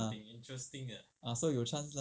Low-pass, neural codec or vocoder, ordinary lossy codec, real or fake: none; none; none; real